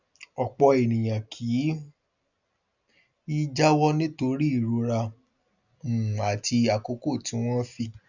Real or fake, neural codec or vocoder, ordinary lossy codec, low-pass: real; none; none; 7.2 kHz